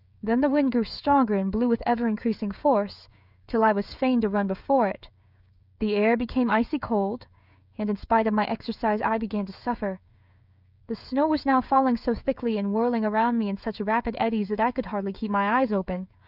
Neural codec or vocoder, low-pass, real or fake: codec, 16 kHz, 16 kbps, FreqCodec, smaller model; 5.4 kHz; fake